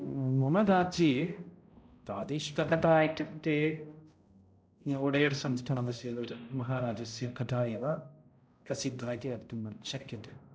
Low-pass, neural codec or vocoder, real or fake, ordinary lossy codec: none; codec, 16 kHz, 0.5 kbps, X-Codec, HuBERT features, trained on balanced general audio; fake; none